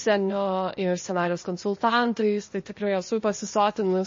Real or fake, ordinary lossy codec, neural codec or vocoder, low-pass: fake; MP3, 32 kbps; codec, 16 kHz, 0.8 kbps, ZipCodec; 7.2 kHz